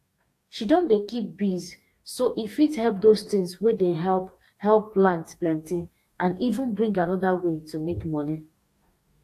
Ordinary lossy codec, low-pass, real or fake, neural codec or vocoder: AAC, 64 kbps; 14.4 kHz; fake; codec, 44.1 kHz, 2.6 kbps, DAC